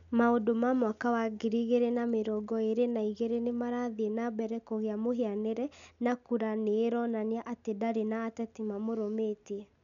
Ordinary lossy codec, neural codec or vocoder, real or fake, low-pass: none; none; real; 7.2 kHz